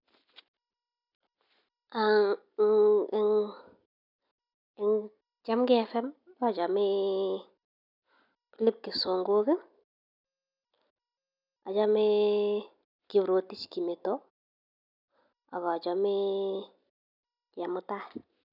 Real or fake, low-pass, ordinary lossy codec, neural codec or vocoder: real; 5.4 kHz; none; none